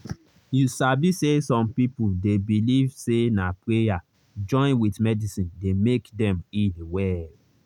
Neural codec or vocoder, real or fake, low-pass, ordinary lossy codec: none; real; 19.8 kHz; none